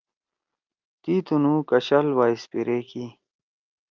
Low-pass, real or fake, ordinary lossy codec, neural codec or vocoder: 7.2 kHz; real; Opus, 24 kbps; none